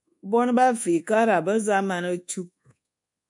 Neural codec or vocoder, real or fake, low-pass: codec, 24 kHz, 1.2 kbps, DualCodec; fake; 10.8 kHz